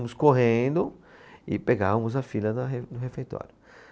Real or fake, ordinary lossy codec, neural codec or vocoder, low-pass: real; none; none; none